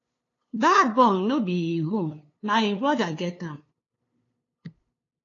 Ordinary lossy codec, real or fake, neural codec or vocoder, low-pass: AAC, 32 kbps; fake; codec, 16 kHz, 2 kbps, FunCodec, trained on LibriTTS, 25 frames a second; 7.2 kHz